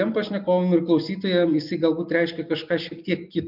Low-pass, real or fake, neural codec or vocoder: 5.4 kHz; real; none